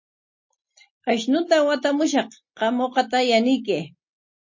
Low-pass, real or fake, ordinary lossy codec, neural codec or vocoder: 7.2 kHz; real; MP3, 32 kbps; none